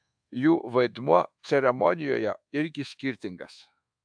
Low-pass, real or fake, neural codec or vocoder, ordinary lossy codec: 9.9 kHz; fake; codec, 24 kHz, 1.2 kbps, DualCodec; AAC, 64 kbps